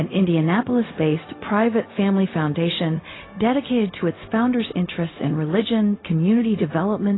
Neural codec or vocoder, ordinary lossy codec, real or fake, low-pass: codec, 16 kHz, 0.4 kbps, LongCat-Audio-Codec; AAC, 16 kbps; fake; 7.2 kHz